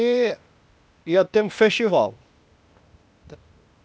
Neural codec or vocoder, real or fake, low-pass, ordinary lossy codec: codec, 16 kHz, 0.8 kbps, ZipCodec; fake; none; none